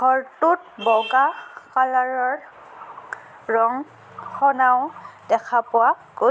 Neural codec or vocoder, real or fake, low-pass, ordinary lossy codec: none; real; none; none